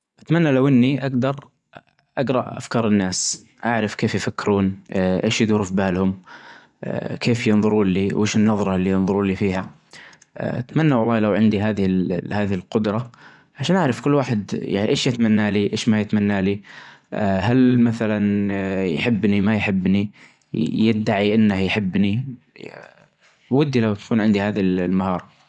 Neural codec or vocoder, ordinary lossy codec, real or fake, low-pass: vocoder, 24 kHz, 100 mel bands, Vocos; none; fake; 10.8 kHz